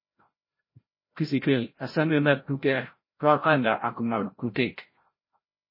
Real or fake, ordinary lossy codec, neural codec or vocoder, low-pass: fake; MP3, 24 kbps; codec, 16 kHz, 0.5 kbps, FreqCodec, larger model; 5.4 kHz